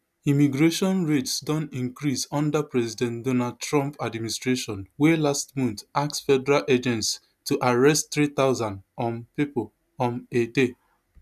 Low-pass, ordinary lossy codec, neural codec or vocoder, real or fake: 14.4 kHz; none; none; real